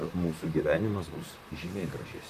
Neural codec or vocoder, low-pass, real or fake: vocoder, 44.1 kHz, 128 mel bands, Pupu-Vocoder; 14.4 kHz; fake